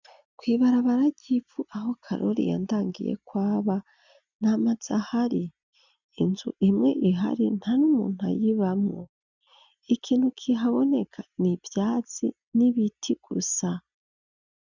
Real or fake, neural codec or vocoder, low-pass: real; none; 7.2 kHz